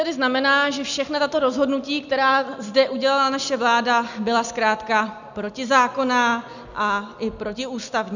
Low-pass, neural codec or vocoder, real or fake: 7.2 kHz; none; real